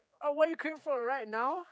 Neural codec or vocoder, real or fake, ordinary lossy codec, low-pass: codec, 16 kHz, 4 kbps, X-Codec, HuBERT features, trained on general audio; fake; none; none